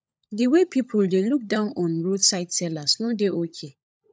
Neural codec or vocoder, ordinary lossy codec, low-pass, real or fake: codec, 16 kHz, 16 kbps, FunCodec, trained on LibriTTS, 50 frames a second; none; none; fake